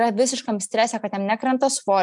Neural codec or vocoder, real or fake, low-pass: none; real; 10.8 kHz